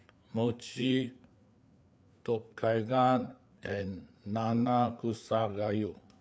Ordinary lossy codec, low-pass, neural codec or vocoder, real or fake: none; none; codec, 16 kHz, 4 kbps, FreqCodec, larger model; fake